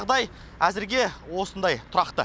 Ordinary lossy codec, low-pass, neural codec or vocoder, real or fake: none; none; none; real